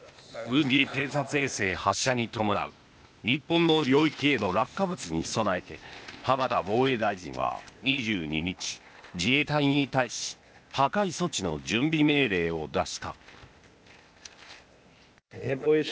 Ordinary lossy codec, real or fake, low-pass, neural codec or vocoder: none; fake; none; codec, 16 kHz, 0.8 kbps, ZipCodec